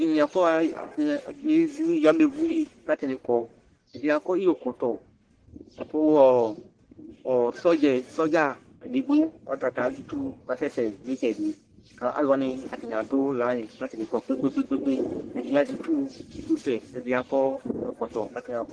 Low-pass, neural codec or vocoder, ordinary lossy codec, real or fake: 9.9 kHz; codec, 44.1 kHz, 1.7 kbps, Pupu-Codec; Opus, 16 kbps; fake